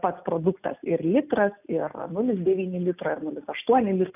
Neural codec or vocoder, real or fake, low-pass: none; real; 3.6 kHz